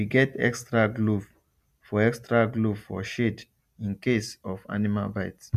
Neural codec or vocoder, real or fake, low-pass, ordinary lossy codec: none; real; 14.4 kHz; MP3, 96 kbps